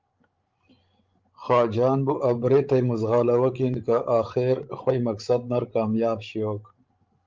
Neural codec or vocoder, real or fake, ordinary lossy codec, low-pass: codec, 16 kHz, 16 kbps, FreqCodec, larger model; fake; Opus, 32 kbps; 7.2 kHz